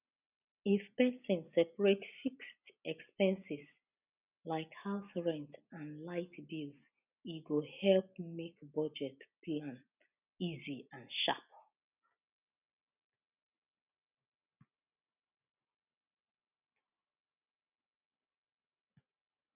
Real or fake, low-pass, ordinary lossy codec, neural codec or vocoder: real; 3.6 kHz; none; none